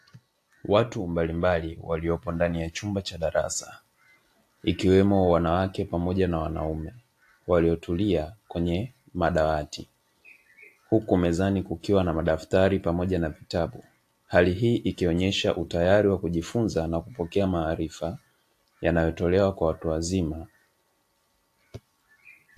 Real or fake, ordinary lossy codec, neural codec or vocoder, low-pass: real; AAC, 64 kbps; none; 14.4 kHz